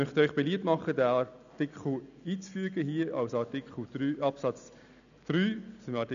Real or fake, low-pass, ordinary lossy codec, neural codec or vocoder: real; 7.2 kHz; none; none